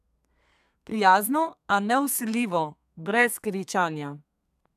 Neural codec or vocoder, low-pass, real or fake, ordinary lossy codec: codec, 32 kHz, 1.9 kbps, SNAC; 14.4 kHz; fake; none